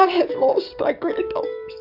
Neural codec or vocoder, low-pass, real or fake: codec, 44.1 kHz, 7.8 kbps, DAC; 5.4 kHz; fake